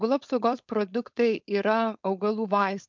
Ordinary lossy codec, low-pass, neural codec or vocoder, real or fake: MP3, 64 kbps; 7.2 kHz; codec, 16 kHz, 4.8 kbps, FACodec; fake